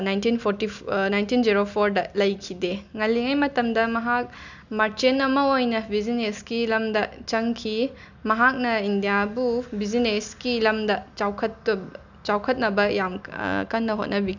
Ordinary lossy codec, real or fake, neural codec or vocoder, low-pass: none; real; none; 7.2 kHz